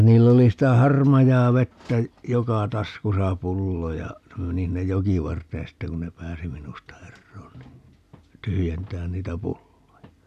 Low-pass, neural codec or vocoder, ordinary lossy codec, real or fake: 14.4 kHz; none; none; real